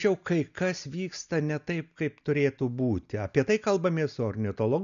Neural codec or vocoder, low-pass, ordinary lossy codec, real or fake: none; 7.2 kHz; MP3, 96 kbps; real